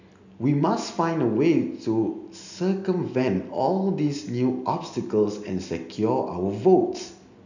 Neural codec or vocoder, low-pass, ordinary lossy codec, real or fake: none; 7.2 kHz; none; real